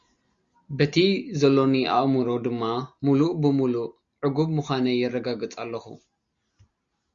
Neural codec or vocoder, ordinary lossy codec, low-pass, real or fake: none; Opus, 64 kbps; 7.2 kHz; real